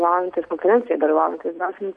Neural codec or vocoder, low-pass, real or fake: none; 10.8 kHz; real